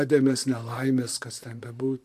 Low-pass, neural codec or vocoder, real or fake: 14.4 kHz; vocoder, 44.1 kHz, 128 mel bands, Pupu-Vocoder; fake